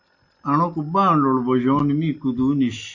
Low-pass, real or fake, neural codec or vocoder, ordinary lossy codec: 7.2 kHz; real; none; AAC, 48 kbps